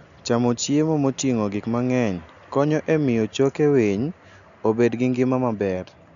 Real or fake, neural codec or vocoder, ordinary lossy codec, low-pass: real; none; none; 7.2 kHz